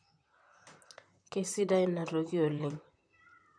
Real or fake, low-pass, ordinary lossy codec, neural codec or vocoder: fake; 9.9 kHz; MP3, 96 kbps; vocoder, 44.1 kHz, 128 mel bands, Pupu-Vocoder